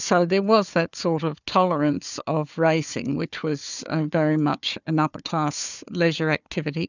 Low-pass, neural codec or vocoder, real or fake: 7.2 kHz; codec, 16 kHz, 4 kbps, FunCodec, trained on Chinese and English, 50 frames a second; fake